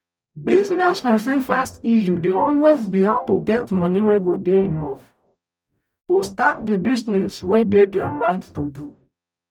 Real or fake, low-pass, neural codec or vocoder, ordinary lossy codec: fake; 19.8 kHz; codec, 44.1 kHz, 0.9 kbps, DAC; none